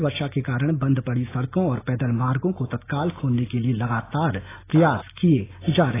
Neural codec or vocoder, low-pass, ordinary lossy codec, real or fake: none; 3.6 kHz; AAC, 16 kbps; real